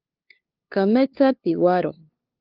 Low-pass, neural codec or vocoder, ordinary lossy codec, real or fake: 5.4 kHz; codec, 16 kHz, 2 kbps, FunCodec, trained on LibriTTS, 25 frames a second; Opus, 24 kbps; fake